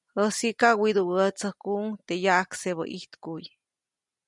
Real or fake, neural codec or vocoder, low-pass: real; none; 10.8 kHz